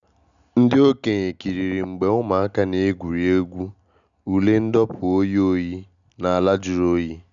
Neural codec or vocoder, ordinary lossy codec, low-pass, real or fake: none; none; 7.2 kHz; real